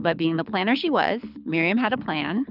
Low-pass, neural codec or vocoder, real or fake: 5.4 kHz; codec, 24 kHz, 6 kbps, HILCodec; fake